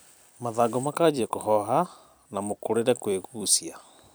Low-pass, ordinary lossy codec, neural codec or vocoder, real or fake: none; none; vocoder, 44.1 kHz, 128 mel bands every 256 samples, BigVGAN v2; fake